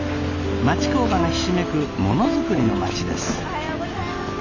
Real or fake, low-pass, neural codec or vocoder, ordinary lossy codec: real; 7.2 kHz; none; none